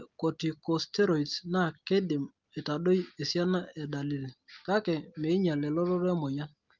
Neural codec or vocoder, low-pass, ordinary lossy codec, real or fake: none; 7.2 kHz; Opus, 32 kbps; real